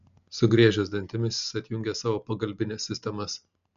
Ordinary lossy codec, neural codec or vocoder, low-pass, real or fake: MP3, 64 kbps; none; 7.2 kHz; real